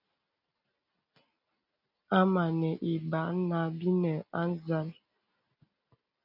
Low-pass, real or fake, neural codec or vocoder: 5.4 kHz; real; none